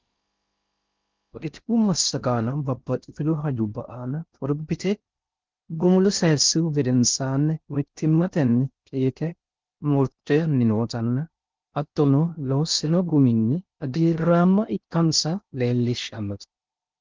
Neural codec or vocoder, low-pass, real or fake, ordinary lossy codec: codec, 16 kHz in and 24 kHz out, 0.6 kbps, FocalCodec, streaming, 4096 codes; 7.2 kHz; fake; Opus, 24 kbps